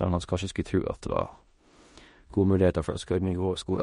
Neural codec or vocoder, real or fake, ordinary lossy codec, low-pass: codec, 16 kHz in and 24 kHz out, 0.9 kbps, LongCat-Audio-Codec, four codebook decoder; fake; MP3, 48 kbps; 10.8 kHz